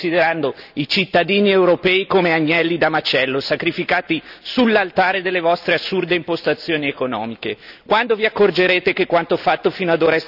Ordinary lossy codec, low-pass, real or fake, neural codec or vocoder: none; 5.4 kHz; real; none